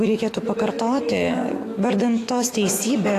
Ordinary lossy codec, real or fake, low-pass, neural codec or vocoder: AAC, 48 kbps; fake; 14.4 kHz; autoencoder, 48 kHz, 128 numbers a frame, DAC-VAE, trained on Japanese speech